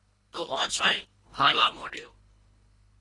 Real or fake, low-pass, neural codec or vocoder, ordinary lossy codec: fake; 10.8 kHz; codec, 24 kHz, 1.5 kbps, HILCodec; AAC, 32 kbps